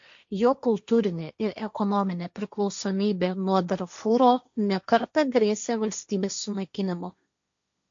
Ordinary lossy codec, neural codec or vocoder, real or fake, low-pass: AAC, 64 kbps; codec, 16 kHz, 1.1 kbps, Voila-Tokenizer; fake; 7.2 kHz